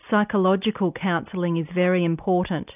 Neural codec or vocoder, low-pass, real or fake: none; 3.6 kHz; real